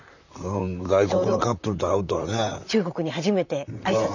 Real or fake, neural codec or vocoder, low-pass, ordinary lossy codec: fake; vocoder, 44.1 kHz, 128 mel bands, Pupu-Vocoder; 7.2 kHz; none